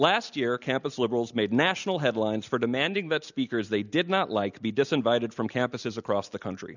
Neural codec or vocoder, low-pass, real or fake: none; 7.2 kHz; real